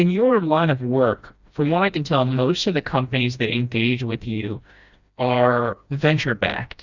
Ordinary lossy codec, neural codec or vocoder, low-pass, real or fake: Opus, 64 kbps; codec, 16 kHz, 1 kbps, FreqCodec, smaller model; 7.2 kHz; fake